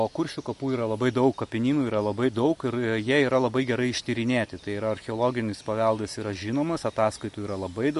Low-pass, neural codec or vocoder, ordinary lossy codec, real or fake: 14.4 kHz; none; MP3, 48 kbps; real